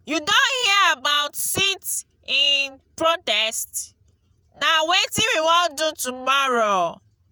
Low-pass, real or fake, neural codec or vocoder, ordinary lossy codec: none; fake; vocoder, 48 kHz, 128 mel bands, Vocos; none